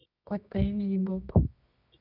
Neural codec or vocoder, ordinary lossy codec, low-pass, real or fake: codec, 24 kHz, 0.9 kbps, WavTokenizer, medium music audio release; none; 5.4 kHz; fake